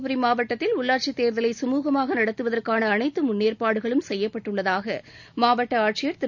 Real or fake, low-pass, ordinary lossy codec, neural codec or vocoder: real; 7.2 kHz; none; none